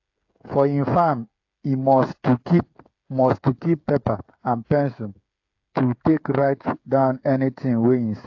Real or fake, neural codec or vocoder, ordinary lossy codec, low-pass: fake; codec, 16 kHz, 8 kbps, FreqCodec, smaller model; AAC, 48 kbps; 7.2 kHz